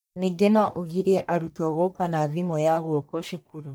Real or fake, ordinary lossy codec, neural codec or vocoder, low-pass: fake; none; codec, 44.1 kHz, 1.7 kbps, Pupu-Codec; none